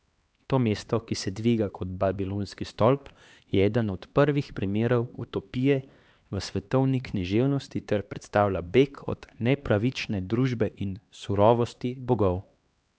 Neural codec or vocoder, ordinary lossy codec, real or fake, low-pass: codec, 16 kHz, 2 kbps, X-Codec, HuBERT features, trained on LibriSpeech; none; fake; none